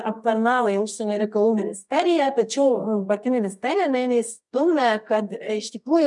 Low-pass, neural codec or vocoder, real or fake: 10.8 kHz; codec, 24 kHz, 0.9 kbps, WavTokenizer, medium music audio release; fake